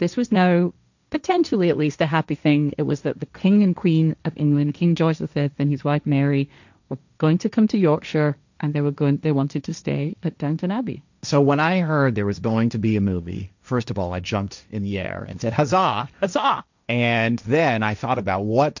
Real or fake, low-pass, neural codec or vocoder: fake; 7.2 kHz; codec, 16 kHz, 1.1 kbps, Voila-Tokenizer